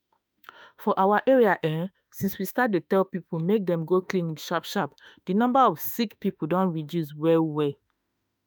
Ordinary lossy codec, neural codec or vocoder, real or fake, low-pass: none; autoencoder, 48 kHz, 32 numbers a frame, DAC-VAE, trained on Japanese speech; fake; none